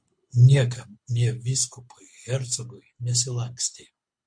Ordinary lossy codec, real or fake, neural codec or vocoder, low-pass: MP3, 48 kbps; fake; codec, 24 kHz, 6 kbps, HILCodec; 9.9 kHz